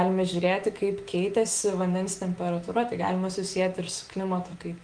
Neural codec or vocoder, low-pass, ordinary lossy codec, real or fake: autoencoder, 48 kHz, 128 numbers a frame, DAC-VAE, trained on Japanese speech; 9.9 kHz; Opus, 24 kbps; fake